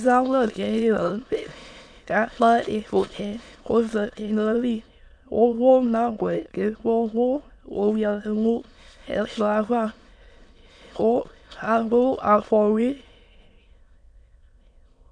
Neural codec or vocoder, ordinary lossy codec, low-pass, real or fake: autoencoder, 22.05 kHz, a latent of 192 numbers a frame, VITS, trained on many speakers; MP3, 64 kbps; 9.9 kHz; fake